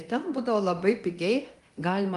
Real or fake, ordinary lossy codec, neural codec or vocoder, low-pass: fake; Opus, 32 kbps; codec, 24 kHz, 0.9 kbps, DualCodec; 10.8 kHz